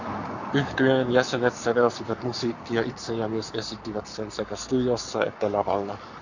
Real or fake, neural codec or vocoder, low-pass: fake; codec, 44.1 kHz, 7.8 kbps, Pupu-Codec; 7.2 kHz